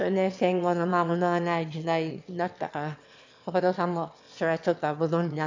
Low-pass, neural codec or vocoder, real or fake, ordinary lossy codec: 7.2 kHz; autoencoder, 22.05 kHz, a latent of 192 numbers a frame, VITS, trained on one speaker; fake; MP3, 48 kbps